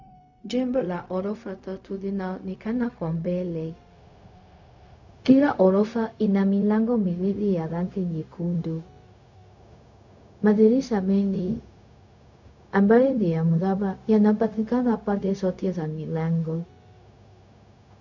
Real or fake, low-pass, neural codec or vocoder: fake; 7.2 kHz; codec, 16 kHz, 0.4 kbps, LongCat-Audio-Codec